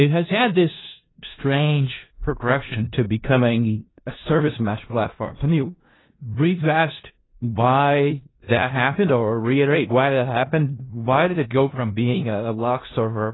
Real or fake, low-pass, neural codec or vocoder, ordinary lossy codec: fake; 7.2 kHz; codec, 16 kHz in and 24 kHz out, 0.4 kbps, LongCat-Audio-Codec, four codebook decoder; AAC, 16 kbps